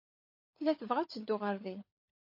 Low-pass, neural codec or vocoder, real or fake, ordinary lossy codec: 5.4 kHz; codec, 16 kHz, 16 kbps, FunCodec, trained on LibriTTS, 50 frames a second; fake; MP3, 32 kbps